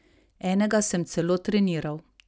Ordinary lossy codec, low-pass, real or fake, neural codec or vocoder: none; none; real; none